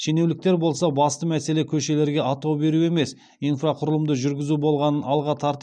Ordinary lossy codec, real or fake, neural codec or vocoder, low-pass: none; real; none; 9.9 kHz